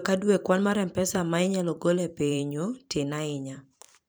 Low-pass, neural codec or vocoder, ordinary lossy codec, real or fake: none; vocoder, 44.1 kHz, 128 mel bands every 512 samples, BigVGAN v2; none; fake